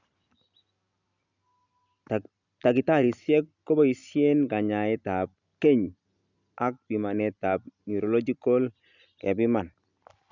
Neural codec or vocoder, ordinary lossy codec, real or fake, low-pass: none; none; real; 7.2 kHz